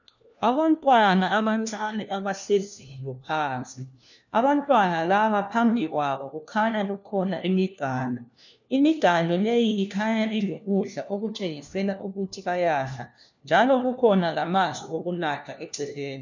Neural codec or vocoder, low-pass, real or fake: codec, 16 kHz, 1 kbps, FunCodec, trained on LibriTTS, 50 frames a second; 7.2 kHz; fake